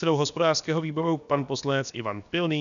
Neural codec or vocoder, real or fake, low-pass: codec, 16 kHz, about 1 kbps, DyCAST, with the encoder's durations; fake; 7.2 kHz